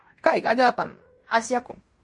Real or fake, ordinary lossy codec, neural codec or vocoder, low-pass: fake; MP3, 48 kbps; codec, 16 kHz in and 24 kHz out, 0.9 kbps, LongCat-Audio-Codec, fine tuned four codebook decoder; 10.8 kHz